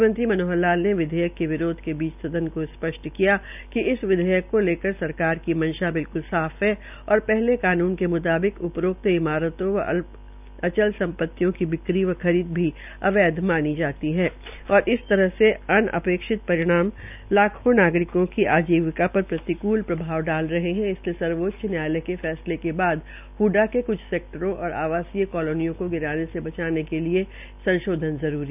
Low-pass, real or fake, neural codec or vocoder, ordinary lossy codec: 3.6 kHz; real; none; none